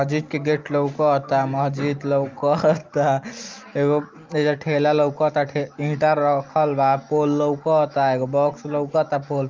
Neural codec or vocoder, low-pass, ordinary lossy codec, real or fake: none; 7.2 kHz; Opus, 32 kbps; real